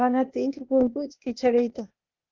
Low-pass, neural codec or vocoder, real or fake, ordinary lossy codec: 7.2 kHz; codec, 16 kHz, 0.8 kbps, ZipCodec; fake; Opus, 32 kbps